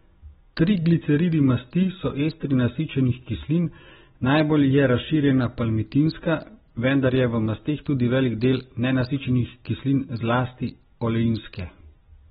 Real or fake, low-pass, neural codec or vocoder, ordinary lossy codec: real; 19.8 kHz; none; AAC, 16 kbps